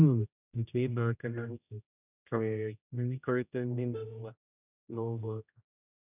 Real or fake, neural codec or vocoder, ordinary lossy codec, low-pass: fake; codec, 16 kHz, 0.5 kbps, X-Codec, HuBERT features, trained on general audio; none; 3.6 kHz